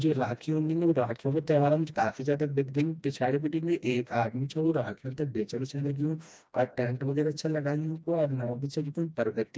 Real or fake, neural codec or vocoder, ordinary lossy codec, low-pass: fake; codec, 16 kHz, 1 kbps, FreqCodec, smaller model; none; none